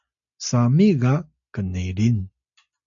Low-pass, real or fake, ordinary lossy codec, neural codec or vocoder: 7.2 kHz; real; MP3, 64 kbps; none